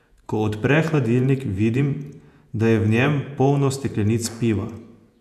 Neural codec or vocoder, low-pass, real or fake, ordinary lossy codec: vocoder, 48 kHz, 128 mel bands, Vocos; 14.4 kHz; fake; none